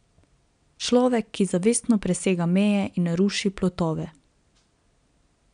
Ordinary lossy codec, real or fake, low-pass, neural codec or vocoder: MP3, 96 kbps; real; 9.9 kHz; none